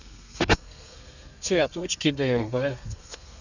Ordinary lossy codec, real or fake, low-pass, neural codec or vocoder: none; fake; 7.2 kHz; codec, 32 kHz, 1.9 kbps, SNAC